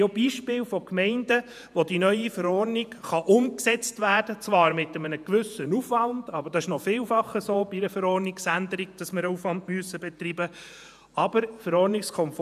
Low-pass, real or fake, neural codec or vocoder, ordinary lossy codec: 14.4 kHz; fake; vocoder, 48 kHz, 128 mel bands, Vocos; none